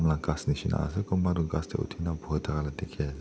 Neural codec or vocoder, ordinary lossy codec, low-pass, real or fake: none; none; none; real